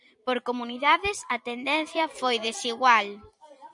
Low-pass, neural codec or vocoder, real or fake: 10.8 kHz; vocoder, 44.1 kHz, 128 mel bands every 256 samples, BigVGAN v2; fake